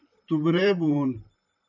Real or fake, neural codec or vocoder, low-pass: fake; vocoder, 44.1 kHz, 128 mel bands, Pupu-Vocoder; 7.2 kHz